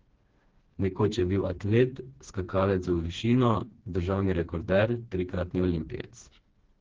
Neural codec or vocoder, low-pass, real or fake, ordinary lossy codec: codec, 16 kHz, 2 kbps, FreqCodec, smaller model; 7.2 kHz; fake; Opus, 16 kbps